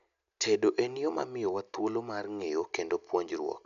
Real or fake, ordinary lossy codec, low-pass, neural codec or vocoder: real; none; 7.2 kHz; none